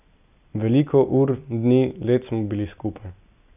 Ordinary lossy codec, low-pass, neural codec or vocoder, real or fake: none; 3.6 kHz; none; real